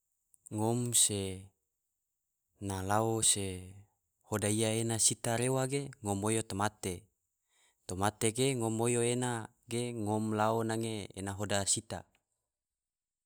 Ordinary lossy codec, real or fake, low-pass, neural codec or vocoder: none; real; none; none